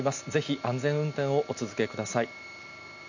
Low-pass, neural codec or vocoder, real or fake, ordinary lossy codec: 7.2 kHz; none; real; none